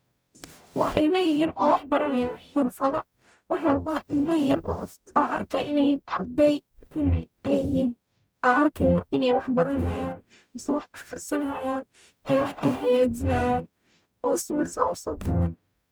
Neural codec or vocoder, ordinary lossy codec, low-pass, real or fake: codec, 44.1 kHz, 0.9 kbps, DAC; none; none; fake